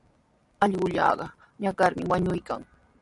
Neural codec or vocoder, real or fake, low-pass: none; real; 10.8 kHz